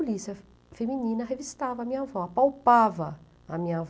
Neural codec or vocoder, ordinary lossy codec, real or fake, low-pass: none; none; real; none